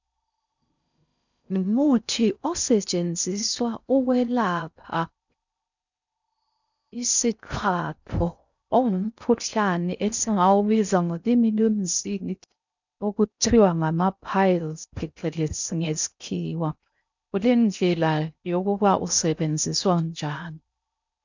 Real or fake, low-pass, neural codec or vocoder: fake; 7.2 kHz; codec, 16 kHz in and 24 kHz out, 0.6 kbps, FocalCodec, streaming, 4096 codes